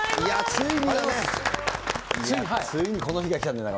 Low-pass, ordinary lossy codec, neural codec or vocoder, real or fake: none; none; none; real